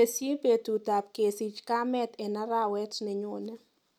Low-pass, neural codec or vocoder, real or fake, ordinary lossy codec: 19.8 kHz; none; real; none